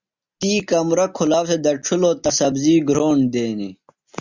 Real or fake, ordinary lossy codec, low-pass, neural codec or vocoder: real; Opus, 64 kbps; 7.2 kHz; none